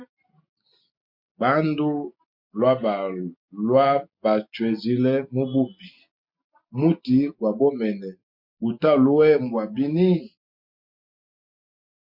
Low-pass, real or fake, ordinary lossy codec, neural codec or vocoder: 5.4 kHz; real; MP3, 48 kbps; none